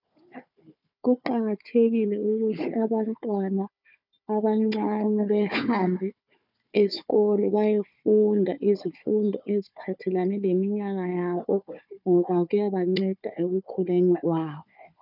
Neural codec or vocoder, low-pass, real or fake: codec, 16 kHz, 4 kbps, FunCodec, trained on Chinese and English, 50 frames a second; 5.4 kHz; fake